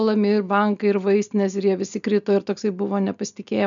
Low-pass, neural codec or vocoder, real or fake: 7.2 kHz; none; real